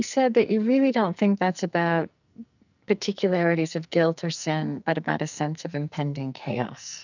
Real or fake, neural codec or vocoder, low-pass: fake; codec, 44.1 kHz, 2.6 kbps, SNAC; 7.2 kHz